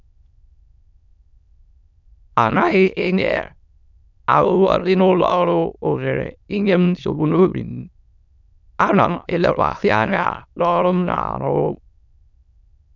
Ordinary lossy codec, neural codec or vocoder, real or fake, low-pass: none; autoencoder, 22.05 kHz, a latent of 192 numbers a frame, VITS, trained on many speakers; fake; 7.2 kHz